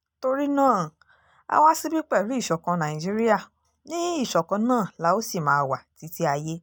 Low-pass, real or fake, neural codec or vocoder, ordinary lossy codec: none; real; none; none